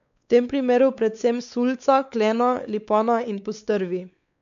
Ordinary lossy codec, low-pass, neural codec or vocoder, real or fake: MP3, 96 kbps; 7.2 kHz; codec, 16 kHz, 4 kbps, X-Codec, WavLM features, trained on Multilingual LibriSpeech; fake